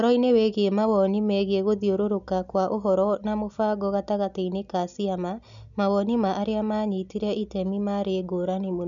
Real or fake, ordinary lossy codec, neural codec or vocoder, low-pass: real; none; none; 7.2 kHz